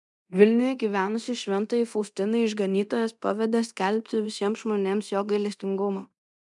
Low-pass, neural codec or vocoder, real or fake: 10.8 kHz; codec, 24 kHz, 0.9 kbps, DualCodec; fake